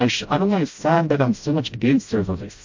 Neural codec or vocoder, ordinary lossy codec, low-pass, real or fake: codec, 16 kHz, 0.5 kbps, FreqCodec, smaller model; MP3, 48 kbps; 7.2 kHz; fake